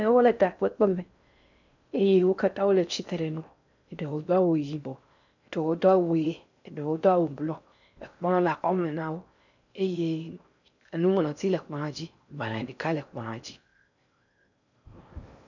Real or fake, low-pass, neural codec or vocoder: fake; 7.2 kHz; codec, 16 kHz in and 24 kHz out, 0.6 kbps, FocalCodec, streaming, 4096 codes